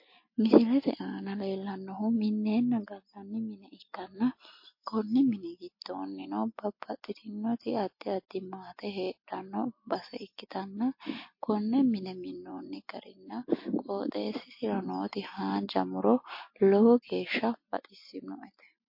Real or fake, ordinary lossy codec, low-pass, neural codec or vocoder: real; MP3, 32 kbps; 5.4 kHz; none